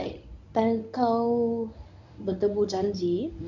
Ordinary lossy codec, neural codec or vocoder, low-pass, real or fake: none; codec, 24 kHz, 0.9 kbps, WavTokenizer, medium speech release version 1; 7.2 kHz; fake